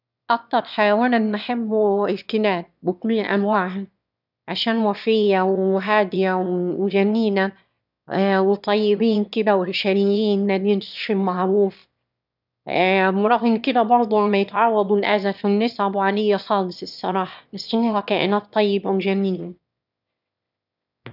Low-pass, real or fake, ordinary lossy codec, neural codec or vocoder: 5.4 kHz; fake; none; autoencoder, 22.05 kHz, a latent of 192 numbers a frame, VITS, trained on one speaker